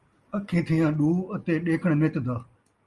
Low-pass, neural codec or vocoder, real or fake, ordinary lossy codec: 10.8 kHz; none; real; Opus, 32 kbps